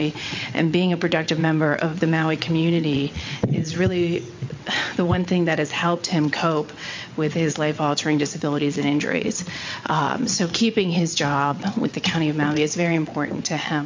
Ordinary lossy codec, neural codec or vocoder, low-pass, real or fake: MP3, 48 kbps; vocoder, 22.05 kHz, 80 mel bands, WaveNeXt; 7.2 kHz; fake